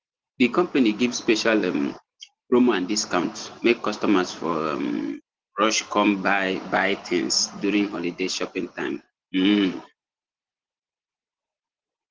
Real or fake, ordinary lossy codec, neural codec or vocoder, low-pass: real; Opus, 16 kbps; none; 7.2 kHz